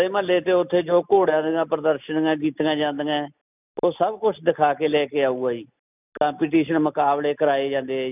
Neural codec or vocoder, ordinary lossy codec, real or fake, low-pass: none; none; real; 3.6 kHz